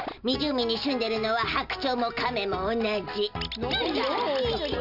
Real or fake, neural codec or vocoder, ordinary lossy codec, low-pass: real; none; none; 5.4 kHz